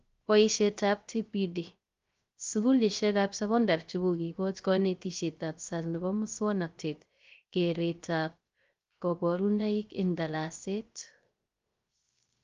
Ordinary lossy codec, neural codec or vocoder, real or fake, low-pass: Opus, 32 kbps; codec, 16 kHz, 0.3 kbps, FocalCodec; fake; 7.2 kHz